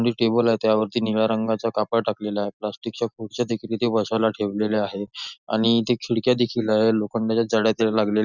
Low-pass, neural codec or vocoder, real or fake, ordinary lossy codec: 7.2 kHz; none; real; none